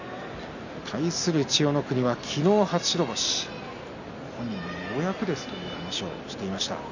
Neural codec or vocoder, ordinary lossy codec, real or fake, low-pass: none; none; real; 7.2 kHz